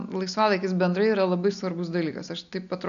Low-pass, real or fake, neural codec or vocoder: 7.2 kHz; real; none